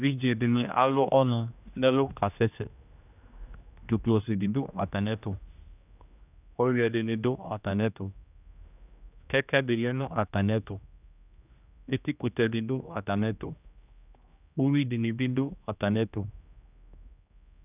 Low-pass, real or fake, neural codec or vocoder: 3.6 kHz; fake; codec, 16 kHz, 1 kbps, X-Codec, HuBERT features, trained on general audio